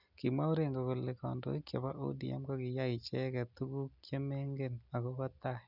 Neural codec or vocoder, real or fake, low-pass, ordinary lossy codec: none; real; 5.4 kHz; none